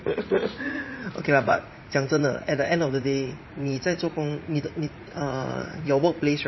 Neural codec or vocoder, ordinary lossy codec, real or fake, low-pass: vocoder, 44.1 kHz, 80 mel bands, Vocos; MP3, 24 kbps; fake; 7.2 kHz